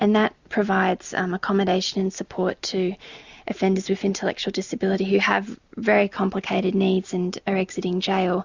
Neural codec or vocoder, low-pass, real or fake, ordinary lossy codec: none; 7.2 kHz; real; Opus, 64 kbps